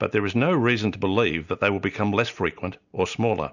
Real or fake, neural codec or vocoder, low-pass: real; none; 7.2 kHz